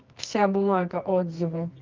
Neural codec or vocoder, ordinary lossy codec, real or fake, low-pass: codec, 24 kHz, 0.9 kbps, WavTokenizer, medium music audio release; Opus, 24 kbps; fake; 7.2 kHz